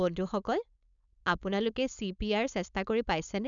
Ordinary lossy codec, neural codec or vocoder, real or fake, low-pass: none; codec, 16 kHz, 4.8 kbps, FACodec; fake; 7.2 kHz